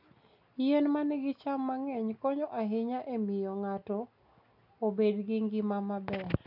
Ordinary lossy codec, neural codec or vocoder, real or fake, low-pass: none; none; real; 5.4 kHz